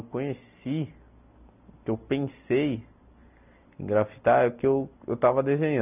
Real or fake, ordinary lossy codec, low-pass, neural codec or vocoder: real; none; 3.6 kHz; none